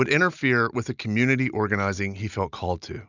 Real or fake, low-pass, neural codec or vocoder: real; 7.2 kHz; none